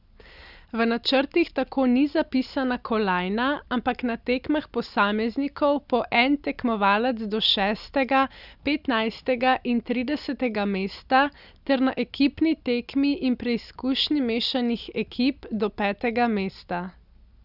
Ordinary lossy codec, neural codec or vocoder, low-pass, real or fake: none; none; 5.4 kHz; real